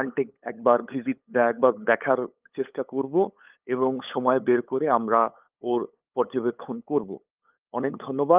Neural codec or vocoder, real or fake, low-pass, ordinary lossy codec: codec, 16 kHz, 8 kbps, FunCodec, trained on LibriTTS, 25 frames a second; fake; 3.6 kHz; Opus, 24 kbps